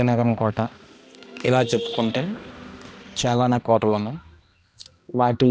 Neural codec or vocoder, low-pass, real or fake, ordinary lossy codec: codec, 16 kHz, 1 kbps, X-Codec, HuBERT features, trained on balanced general audio; none; fake; none